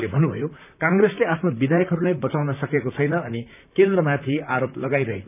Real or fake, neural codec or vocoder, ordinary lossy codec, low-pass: fake; vocoder, 44.1 kHz, 128 mel bands, Pupu-Vocoder; none; 3.6 kHz